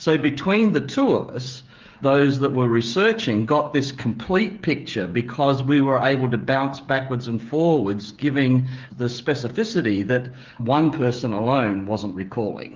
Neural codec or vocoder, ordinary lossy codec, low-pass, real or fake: codec, 16 kHz, 8 kbps, FreqCodec, smaller model; Opus, 32 kbps; 7.2 kHz; fake